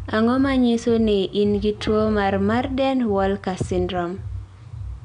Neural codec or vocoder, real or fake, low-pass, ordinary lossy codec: none; real; 9.9 kHz; none